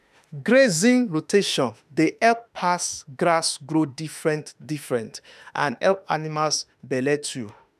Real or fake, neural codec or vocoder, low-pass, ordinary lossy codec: fake; autoencoder, 48 kHz, 32 numbers a frame, DAC-VAE, trained on Japanese speech; 14.4 kHz; none